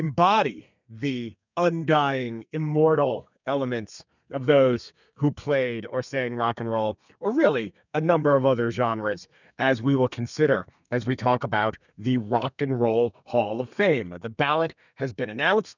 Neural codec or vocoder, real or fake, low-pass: codec, 32 kHz, 1.9 kbps, SNAC; fake; 7.2 kHz